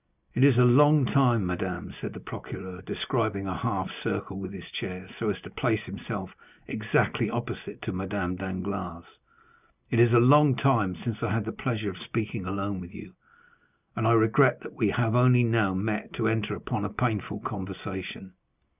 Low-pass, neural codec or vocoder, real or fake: 3.6 kHz; none; real